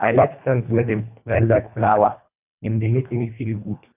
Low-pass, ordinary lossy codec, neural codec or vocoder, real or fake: 3.6 kHz; none; codec, 24 kHz, 1.5 kbps, HILCodec; fake